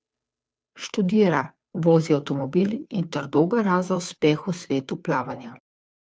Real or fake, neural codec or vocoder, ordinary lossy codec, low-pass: fake; codec, 16 kHz, 2 kbps, FunCodec, trained on Chinese and English, 25 frames a second; none; none